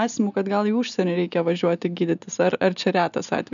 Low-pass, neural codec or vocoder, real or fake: 7.2 kHz; none; real